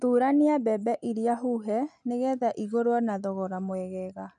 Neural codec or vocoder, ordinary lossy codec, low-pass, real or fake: none; none; 9.9 kHz; real